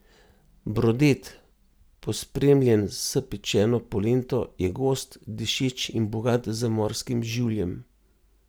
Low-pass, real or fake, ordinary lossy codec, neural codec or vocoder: none; real; none; none